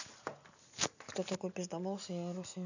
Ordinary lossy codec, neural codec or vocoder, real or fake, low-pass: none; none; real; 7.2 kHz